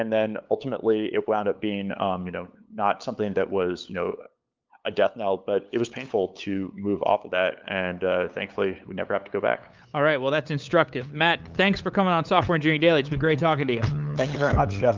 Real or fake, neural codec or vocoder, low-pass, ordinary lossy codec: fake; codec, 16 kHz, 8 kbps, FunCodec, trained on LibriTTS, 25 frames a second; 7.2 kHz; Opus, 24 kbps